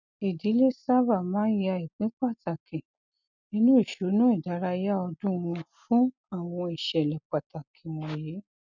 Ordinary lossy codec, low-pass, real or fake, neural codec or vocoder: none; 7.2 kHz; real; none